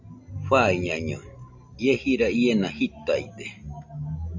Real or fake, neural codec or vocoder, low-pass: real; none; 7.2 kHz